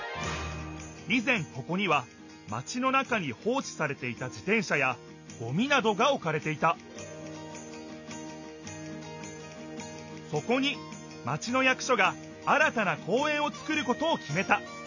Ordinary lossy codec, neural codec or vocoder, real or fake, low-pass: none; none; real; 7.2 kHz